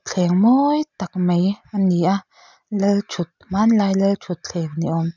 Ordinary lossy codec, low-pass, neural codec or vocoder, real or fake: none; 7.2 kHz; none; real